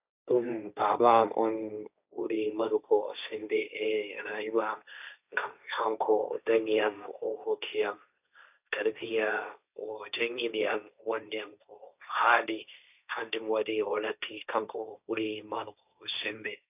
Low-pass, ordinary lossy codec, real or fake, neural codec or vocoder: 3.6 kHz; none; fake; codec, 16 kHz, 1.1 kbps, Voila-Tokenizer